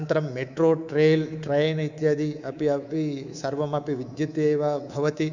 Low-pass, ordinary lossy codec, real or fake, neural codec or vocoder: 7.2 kHz; none; fake; codec, 24 kHz, 3.1 kbps, DualCodec